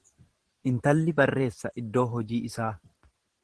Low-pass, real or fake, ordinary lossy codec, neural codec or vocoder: 10.8 kHz; fake; Opus, 16 kbps; vocoder, 44.1 kHz, 128 mel bands every 512 samples, BigVGAN v2